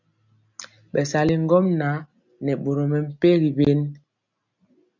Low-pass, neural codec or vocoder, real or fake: 7.2 kHz; none; real